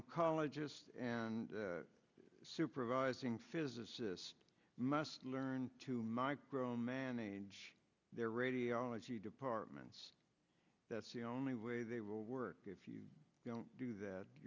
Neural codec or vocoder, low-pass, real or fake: none; 7.2 kHz; real